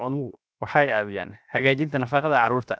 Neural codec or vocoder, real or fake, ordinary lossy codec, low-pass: codec, 16 kHz, 0.7 kbps, FocalCodec; fake; none; none